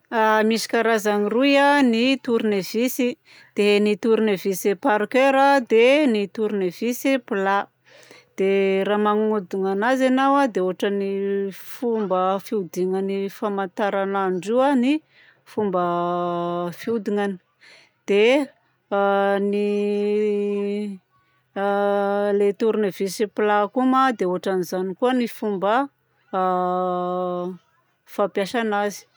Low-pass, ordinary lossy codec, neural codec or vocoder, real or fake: none; none; none; real